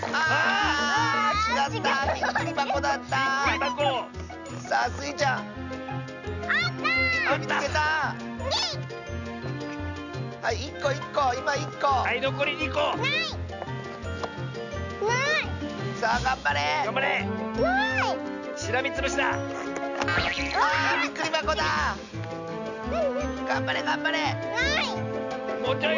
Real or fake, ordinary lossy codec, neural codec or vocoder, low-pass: real; none; none; 7.2 kHz